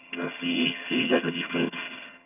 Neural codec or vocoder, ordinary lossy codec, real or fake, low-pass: vocoder, 22.05 kHz, 80 mel bands, HiFi-GAN; none; fake; 3.6 kHz